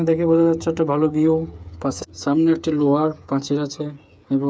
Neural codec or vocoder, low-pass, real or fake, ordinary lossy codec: codec, 16 kHz, 8 kbps, FreqCodec, smaller model; none; fake; none